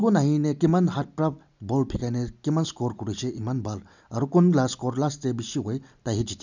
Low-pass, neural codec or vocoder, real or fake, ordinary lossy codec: 7.2 kHz; none; real; none